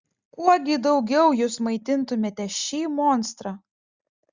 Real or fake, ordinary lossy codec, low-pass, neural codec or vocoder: real; Opus, 64 kbps; 7.2 kHz; none